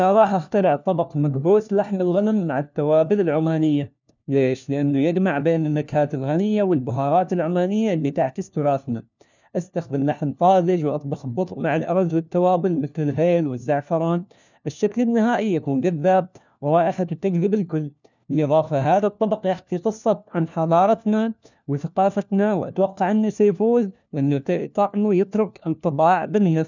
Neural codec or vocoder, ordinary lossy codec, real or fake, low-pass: codec, 16 kHz, 1 kbps, FunCodec, trained on LibriTTS, 50 frames a second; none; fake; 7.2 kHz